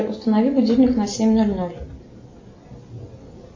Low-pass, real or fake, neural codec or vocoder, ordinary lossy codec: 7.2 kHz; real; none; MP3, 32 kbps